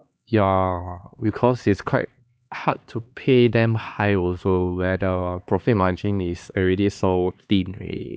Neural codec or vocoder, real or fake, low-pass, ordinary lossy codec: codec, 16 kHz, 2 kbps, X-Codec, HuBERT features, trained on LibriSpeech; fake; none; none